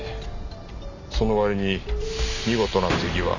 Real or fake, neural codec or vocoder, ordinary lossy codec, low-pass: real; none; none; 7.2 kHz